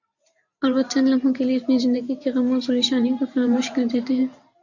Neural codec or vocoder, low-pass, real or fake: vocoder, 44.1 kHz, 128 mel bands every 512 samples, BigVGAN v2; 7.2 kHz; fake